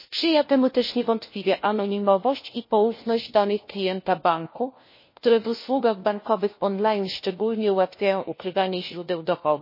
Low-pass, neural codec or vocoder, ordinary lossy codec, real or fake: 5.4 kHz; codec, 16 kHz, 1 kbps, FunCodec, trained on LibriTTS, 50 frames a second; MP3, 24 kbps; fake